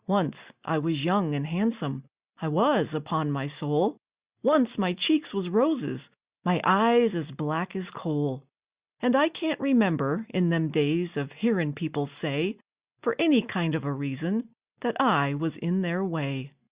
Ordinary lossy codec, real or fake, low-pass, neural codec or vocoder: Opus, 64 kbps; real; 3.6 kHz; none